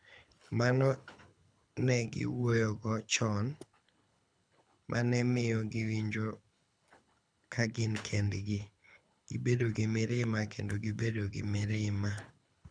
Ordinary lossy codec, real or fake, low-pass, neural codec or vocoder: none; fake; 9.9 kHz; codec, 24 kHz, 6 kbps, HILCodec